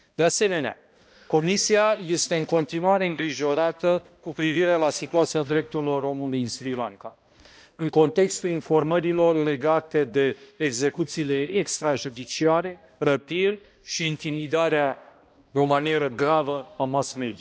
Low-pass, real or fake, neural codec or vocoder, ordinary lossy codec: none; fake; codec, 16 kHz, 1 kbps, X-Codec, HuBERT features, trained on balanced general audio; none